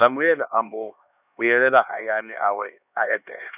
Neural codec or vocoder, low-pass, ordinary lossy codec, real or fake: codec, 16 kHz, 2 kbps, X-Codec, HuBERT features, trained on LibriSpeech; 3.6 kHz; none; fake